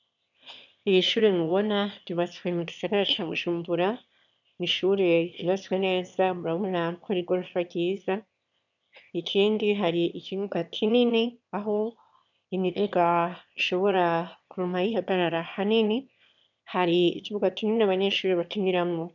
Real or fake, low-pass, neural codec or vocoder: fake; 7.2 kHz; autoencoder, 22.05 kHz, a latent of 192 numbers a frame, VITS, trained on one speaker